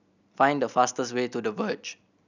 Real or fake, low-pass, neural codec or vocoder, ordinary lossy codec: real; 7.2 kHz; none; none